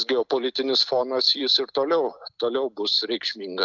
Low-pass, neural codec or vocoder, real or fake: 7.2 kHz; none; real